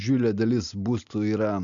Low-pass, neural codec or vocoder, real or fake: 7.2 kHz; none; real